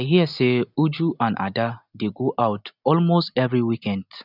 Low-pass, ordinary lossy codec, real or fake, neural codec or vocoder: 5.4 kHz; none; real; none